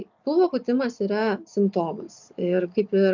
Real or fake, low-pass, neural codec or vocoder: real; 7.2 kHz; none